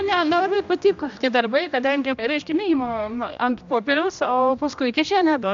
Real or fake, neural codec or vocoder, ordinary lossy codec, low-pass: fake; codec, 16 kHz, 1 kbps, X-Codec, HuBERT features, trained on general audio; MP3, 64 kbps; 7.2 kHz